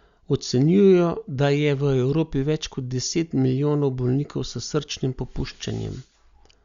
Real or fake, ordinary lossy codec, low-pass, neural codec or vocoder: real; Opus, 64 kbps; 7.2 kHz; none